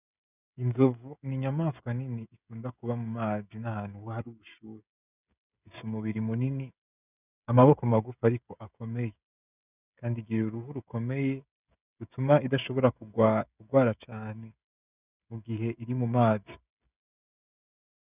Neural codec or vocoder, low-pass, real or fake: codec, 16 kHz, 16 kbps, FreqCodec, smaller model; 3.6 kHz; fake